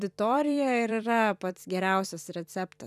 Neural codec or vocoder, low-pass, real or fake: vocoder, 44.1 kHz, 128 mel bands every 256 samples, BigVGAN v2; 14.4 kHz; fake